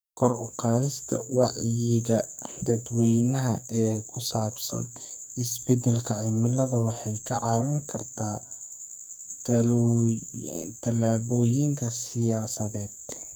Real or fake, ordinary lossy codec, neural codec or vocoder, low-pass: fake; none; codec, 44.1 kHz, 2.6 kbps, SNAC; none